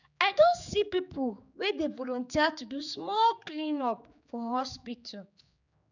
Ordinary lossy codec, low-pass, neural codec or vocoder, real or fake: none; 7.2 kHz; codec, 16 kHz, 4 kbps, X-Codec, HuBERT features, trained on general audio; fake